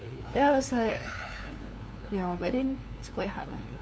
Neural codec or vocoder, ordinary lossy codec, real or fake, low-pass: codec, 16 kHz, 2 kbps, FunCodec, trained on LibriTTS, 25 frames a second; none; fake; none